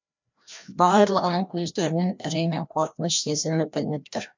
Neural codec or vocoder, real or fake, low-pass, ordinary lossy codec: codec, 16 kHz, 1 kbps, FreqCodec, larger model; fake; 7.2 kHz; MP3, 64 kbps